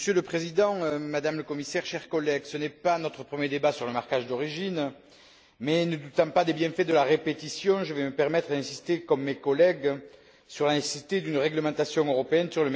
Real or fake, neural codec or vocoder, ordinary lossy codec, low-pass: real; none; none; none